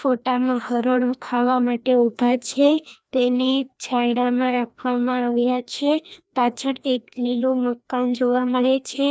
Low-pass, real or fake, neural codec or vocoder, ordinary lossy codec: none; fake; codec, 16 kHz, 1 kbps, FreqCodec, larger model; none